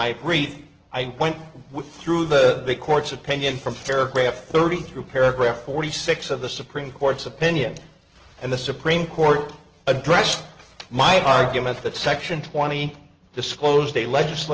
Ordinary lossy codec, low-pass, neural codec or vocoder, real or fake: Opus, 16 kbps; 7.2 kHz; none; real